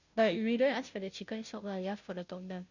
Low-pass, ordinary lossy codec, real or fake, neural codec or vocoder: 7.2 kHz; none; fake; codec, 16 kHz, 0.5 kbps, FunCodec, trained on Chinese and English, 25 frames a second